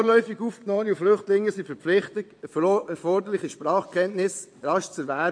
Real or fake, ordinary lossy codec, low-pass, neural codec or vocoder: fake; MP3, 48 kbps; 9.9 kHz; vocoder, 22.05 kHz, 80 mel bands, WaveNeXt